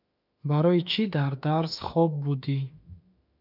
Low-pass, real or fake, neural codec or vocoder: 5.4 kHz; fake; autoencoder, 48 kHz, 32 numbers a frame, DAC-VAE, trained on Japanese speech